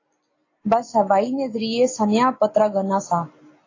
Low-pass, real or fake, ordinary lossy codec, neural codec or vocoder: 7.2 kHz; real; AAC, 32 kbps; none